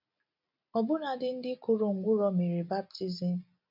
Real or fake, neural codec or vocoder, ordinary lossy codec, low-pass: real; none; none; 5.4 kHz